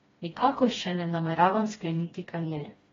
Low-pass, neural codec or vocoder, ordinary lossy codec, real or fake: 7.2 kHz; codec, 16 kHz, 1 kbps, FreqCodec, smaller model; AAC, 24 kbps; fake